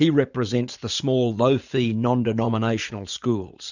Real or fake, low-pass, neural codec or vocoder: fake; 7.2 kHz; vocoder, 44.1 kHz, 80 mel bands, Vocos